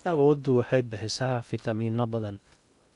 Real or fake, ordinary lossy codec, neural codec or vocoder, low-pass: fake; none; codec, 16 kHz in and 24 kHz out, 0.6 kbps, FocalCodec, streaming, 2048 codes; 10.8 kHz